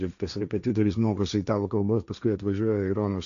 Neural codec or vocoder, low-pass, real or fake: codec, 16 kHz, 1.1 kbps, Voila-Tokenizer; 7.2 kHz; fake